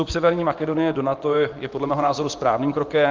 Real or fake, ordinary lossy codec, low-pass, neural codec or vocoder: fake; Opus, 32 kbps; 7.2 kHz; vocoder, 24 kHz, 100 mel bands, Vocos